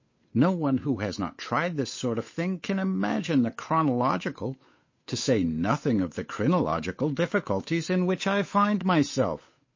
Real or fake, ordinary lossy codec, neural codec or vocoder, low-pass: fake; MP3, 32 kbps; codec, 16 kHz, 8 kbps, FunCodec, trained on Chinese and English, 25 frames a second; 7.2 kHz